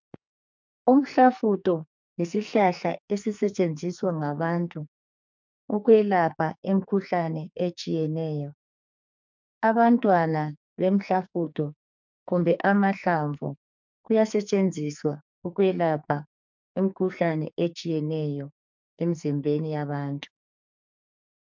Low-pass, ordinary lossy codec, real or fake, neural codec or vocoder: 7.2 kHz; MP3, 64 kbps; fake; codec, 44.1 kHz, 2.6 kbps, SNAC